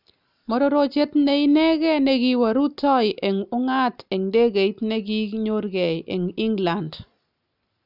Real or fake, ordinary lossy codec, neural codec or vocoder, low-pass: real; none; none; 5.4 kHz